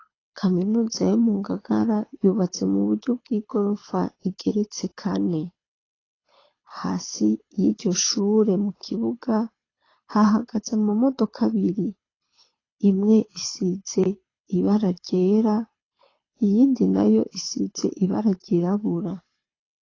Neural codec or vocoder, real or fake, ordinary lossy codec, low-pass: codec, 24 kHz, 6 kbps, HILCodec; fake; AAC, 32 kbps; 7.2 kHz